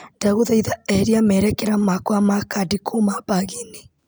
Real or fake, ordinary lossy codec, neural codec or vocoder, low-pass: real; none; none; none